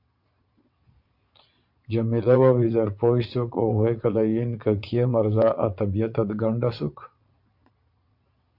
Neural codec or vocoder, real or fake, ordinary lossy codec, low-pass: vocoder, 22.05 kHz, 80 mel bands, Vocos; fake; MP3, 48 kbps; 5.4 kHz